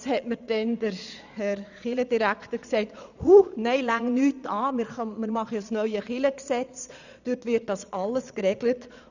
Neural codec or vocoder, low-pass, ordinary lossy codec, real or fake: vocoder, 22.05 kHz, 80 mel bands, Vocos; 7.2 kHz; none; fake